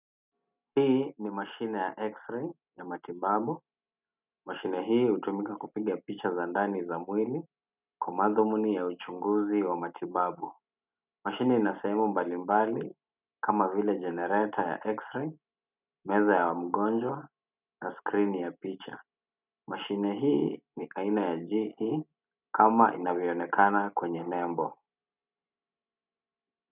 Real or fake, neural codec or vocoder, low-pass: real; none; 3.6 kHz